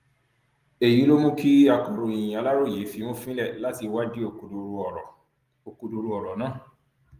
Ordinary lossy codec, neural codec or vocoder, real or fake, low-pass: Opus, 24 kbps; none; real; 14.4 kHz